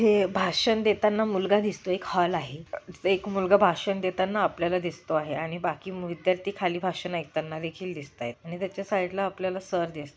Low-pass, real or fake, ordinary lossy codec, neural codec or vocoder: none; real; none; none